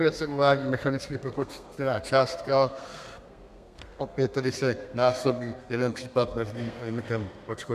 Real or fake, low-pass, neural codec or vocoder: fake; 14.4 kHz; codec, 32 kHz, 1.9 kbps, SNAC